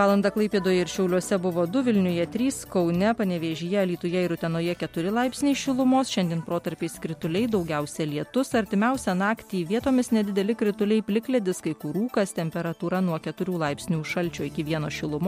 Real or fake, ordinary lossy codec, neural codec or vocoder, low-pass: real; MP3, 64 kbps; none; 19.8 kHz